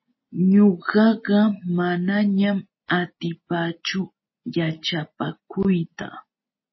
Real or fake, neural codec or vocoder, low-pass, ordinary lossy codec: real; none; 7.2 kHz; MP3, 24 kbps